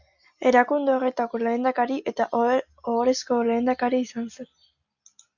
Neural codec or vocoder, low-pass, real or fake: none; 7.2 kHz; real